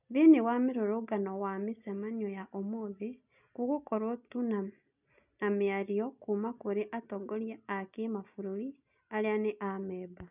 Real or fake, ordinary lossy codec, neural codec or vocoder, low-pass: real; none; none; 3.6 kHz